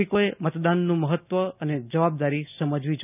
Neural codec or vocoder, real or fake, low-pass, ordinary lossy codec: none; real; 3.6 kHz; none